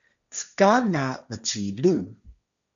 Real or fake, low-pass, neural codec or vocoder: fake; 7.2 kHz; codec, 16 kHz, 1.1 kbps, Voila-Tokenizer